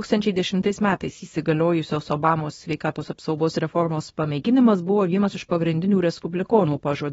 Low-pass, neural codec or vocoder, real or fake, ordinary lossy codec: 10.8 kHz; codec, 24 kHz, 0.9 kbps, WavTokenizer, small release; fake; AAC, 24 kbps